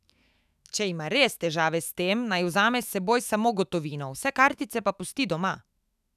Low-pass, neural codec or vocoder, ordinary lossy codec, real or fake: 14.4 kHz; autoencoder, 48 kHz, 128 numbers a frame, DAC-VAE, trained on Japanese speech; none; fake